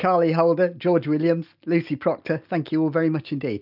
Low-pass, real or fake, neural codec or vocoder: 5.4 kHz; real; none